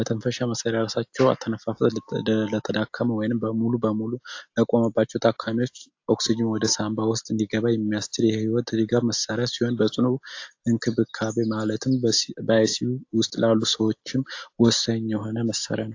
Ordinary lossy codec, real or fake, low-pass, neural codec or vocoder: AAC, 48 kbps; real; 7.2 kHz; none